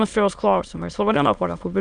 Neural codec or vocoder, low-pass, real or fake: autoencoder, 22.05 kHz, a latent of 192 numbers a frame, VITS, trained on many speakers; 9.9 kHz; fake